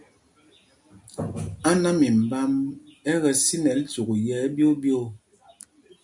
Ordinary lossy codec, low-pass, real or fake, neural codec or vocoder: MP3, 96 kbps; 10.8 kHz; real; none